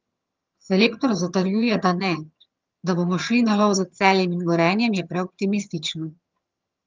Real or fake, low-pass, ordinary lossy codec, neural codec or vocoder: fake; 7.2 kHz; Opus, 24 kbps; vocoder, 22.05 kHz, 80 mel bands, HiFi-GAN